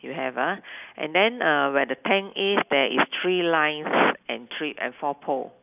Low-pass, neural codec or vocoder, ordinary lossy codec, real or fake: 3.6 kHz; none; none; real